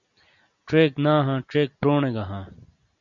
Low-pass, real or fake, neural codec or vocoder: 7.2 kHz; real; none